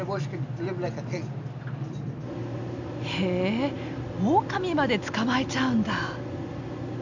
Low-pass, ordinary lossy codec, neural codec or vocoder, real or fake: 7.2 kHz; none; none; real